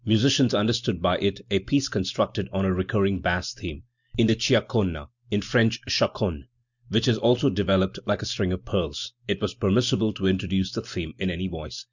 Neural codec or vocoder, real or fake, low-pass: none; real; 7.2 kHz